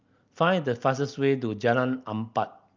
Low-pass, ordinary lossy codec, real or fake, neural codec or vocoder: 7.2 kHz; Opus, 24 kbps; real; none